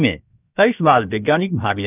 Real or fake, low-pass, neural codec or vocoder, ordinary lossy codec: fake; 3.6 kHz; codec, 16 kHz, 0.7 kbps, FocalCodec; none